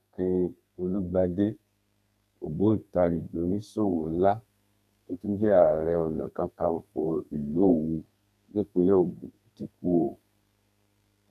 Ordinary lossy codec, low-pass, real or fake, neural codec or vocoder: none; 14.4 kHz; fake; codec, 32 kHz, 1.9 kbps, SNAC